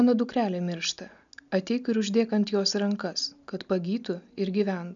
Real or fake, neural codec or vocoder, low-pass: real; none; 7.2 kHz